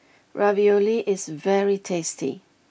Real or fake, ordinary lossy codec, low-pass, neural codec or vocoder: real; none; none; none